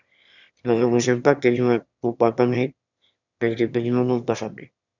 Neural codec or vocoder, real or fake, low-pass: autoencoder, 22.05 kHz, a latent of 192 numbers a frame, VITS, trained on one speaker; fake; 7.2 kHz